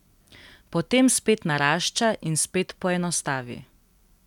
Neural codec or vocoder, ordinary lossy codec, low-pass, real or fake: none; none; 19.8 kHz; real